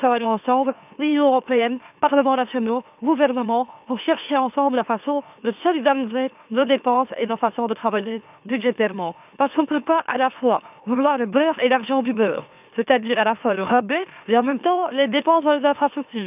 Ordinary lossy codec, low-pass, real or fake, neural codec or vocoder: none; 3.6 kHz; fake; autoencoder, 44.1 kHz, a latent of 192 numbers a frame, MeloTTS